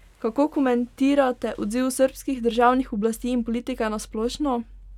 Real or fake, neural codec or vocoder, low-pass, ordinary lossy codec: real; none; 19.8 kHz; none